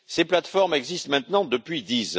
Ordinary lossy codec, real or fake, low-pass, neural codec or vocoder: none; real; none; none